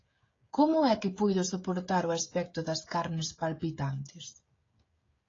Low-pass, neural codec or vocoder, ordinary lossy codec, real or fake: 7.2 kHz; codec, 16 kHz, 16 kbps, FreqCodec, smaller model; AAC, 32 kbps; fake